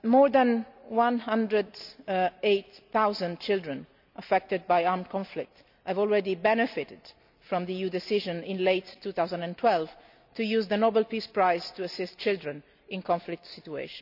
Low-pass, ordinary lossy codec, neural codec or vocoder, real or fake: 5.4 kHz; none; none; real